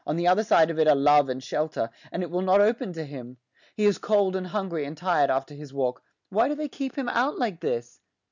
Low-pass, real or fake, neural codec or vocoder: 7.2 kHz; real; none